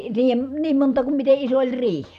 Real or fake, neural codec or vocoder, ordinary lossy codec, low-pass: fake; vocoder, 44.1 kHz, 128 mel bands every 256 samples, BigVGAN v2; none; 14.4 kHz